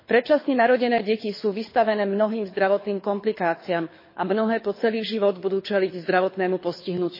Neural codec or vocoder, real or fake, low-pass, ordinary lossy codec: codec, 24 kHz, 6 kbps, HILCodec; fake; 5.4 kHz; MP3, 24 kbps